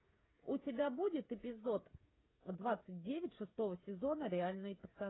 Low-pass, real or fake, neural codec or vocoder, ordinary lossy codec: 7.2 kHz; fake; codec, 16 kHz, 8 kbps, FreqCodec, smaller model; AAC, 16 kbps